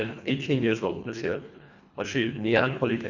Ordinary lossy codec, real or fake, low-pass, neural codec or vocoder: none; fake; 7.2 kHz; codec, 24 kHz, 1.5 kbps, HILCodec